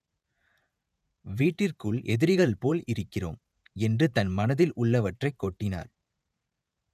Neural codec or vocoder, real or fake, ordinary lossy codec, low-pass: vocoder, 48 kHz, 128 mel bands, Vocos; fake; none; 14.4 kHz